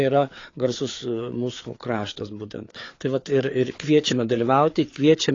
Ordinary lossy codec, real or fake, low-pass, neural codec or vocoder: AAC, 32 kbps; fake; 7.2 kHz; codec, 16 kHz, 4 kbps, FreqCodec, larger model